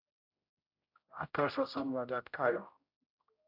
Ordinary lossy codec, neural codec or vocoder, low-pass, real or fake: AAC, 48 kbps; codec, 16 kHz, 0.5 kbps, X-Codec, HuBERT features, trained on general audio; 5.4 kHz; fake